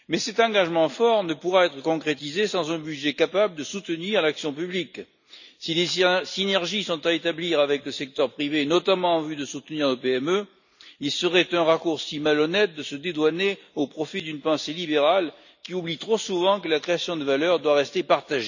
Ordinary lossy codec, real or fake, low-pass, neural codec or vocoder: none; real; 7.2 kHz; none